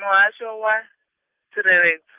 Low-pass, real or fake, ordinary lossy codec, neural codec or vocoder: 3.6 kHz; real; Opus, 24 kbps; none